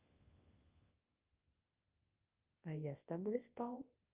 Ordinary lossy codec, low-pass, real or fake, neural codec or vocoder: Opus, 24 kbps; 3.6 kHz; fake; codec, 24 kHz, 0.9 kbps, WavTokenizer, large speech release